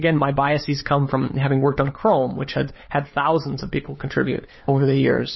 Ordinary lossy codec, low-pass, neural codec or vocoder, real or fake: MP3, 24 kbps; 7.2 kHz; codec, 16 kHz, 4 kbps, FunCodec, trained on LibriTTS, 50 frames a second; fake